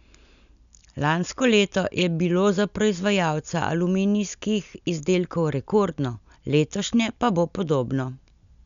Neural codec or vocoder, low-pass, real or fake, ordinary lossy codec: none; 7.2 kHz; real; none